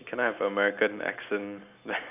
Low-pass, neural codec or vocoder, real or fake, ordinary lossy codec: 3.6 kHz; none; real; none